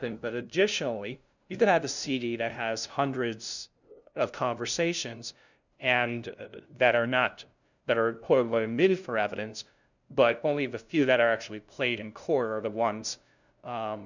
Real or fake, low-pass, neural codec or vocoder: fake; 7.2 kHz; codec, 16 kHz, 0.5 kbps, FunCodec, trained on LibriTTS, 25 frames a second